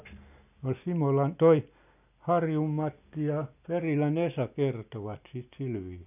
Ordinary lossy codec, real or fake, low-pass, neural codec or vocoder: none; real; 3.6 kHz; none